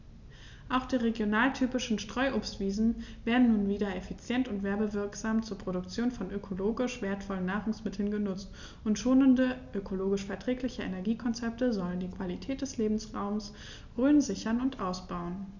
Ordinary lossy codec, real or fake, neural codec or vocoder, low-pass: none; real; none; 7.2 kHz